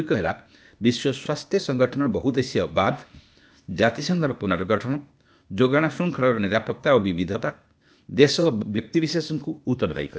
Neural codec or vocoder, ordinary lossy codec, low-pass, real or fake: codec, 16 kHz, 0.8 kbps, ZipCodec; none; none; fake